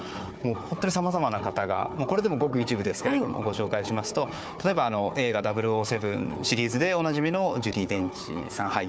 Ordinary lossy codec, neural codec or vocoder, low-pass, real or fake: none; codec, 16 kHz, 4 kbps, FunCodec, trained on Chinese and English, 50 frames a second; none; fake